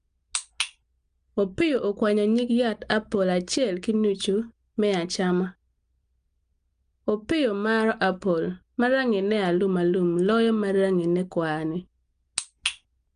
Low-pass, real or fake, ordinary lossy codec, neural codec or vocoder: 9.9 kHz; real; Opus, 32 kbps; none